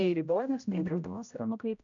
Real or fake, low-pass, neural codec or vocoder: fake; 7.2 kHz; codec, 16 kHz, 0.5 kbps, X-Codec, HuBERT features, trained on general audio